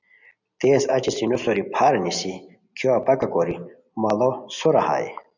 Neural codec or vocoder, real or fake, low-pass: none; real; 7.2 kHz